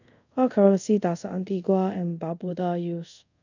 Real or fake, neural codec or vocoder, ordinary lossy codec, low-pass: fake; codec, 24 kHz, 0.5 kbps, DualCodec; none; 7.2 kHz